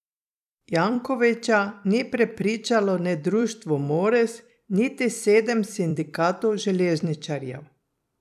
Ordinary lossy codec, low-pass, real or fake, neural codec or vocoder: none; 14.4 kHz; fake; vocoder, 44.1 kHz, 128 mel bands every 256 samples, BigVGAN v2